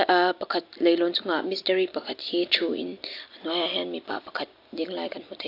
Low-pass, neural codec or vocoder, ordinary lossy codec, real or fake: 5.4 kHz; none; AAC, 32 kbps; real